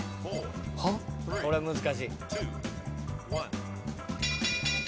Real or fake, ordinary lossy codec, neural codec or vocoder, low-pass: real; none; none; none